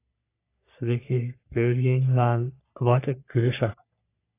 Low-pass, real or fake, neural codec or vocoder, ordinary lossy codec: 3.6 kHz; fake; codec, 44.1 kHz, 3.4 kbps, Pupu-Codec; AAC, 24 kbps